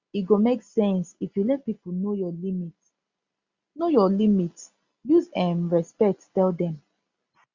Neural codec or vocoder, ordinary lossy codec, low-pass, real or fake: none; Opus, 64 kbps; 7.2 kHz; real